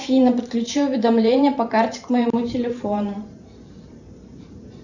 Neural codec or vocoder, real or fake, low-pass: vocoder, 44.1 kHz, 128 mel bands every 512 samples, BigVGAN v2; fake; 7.2 kHz